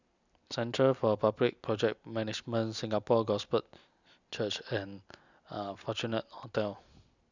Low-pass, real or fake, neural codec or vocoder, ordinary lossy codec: 7.2 kHz; real; none; none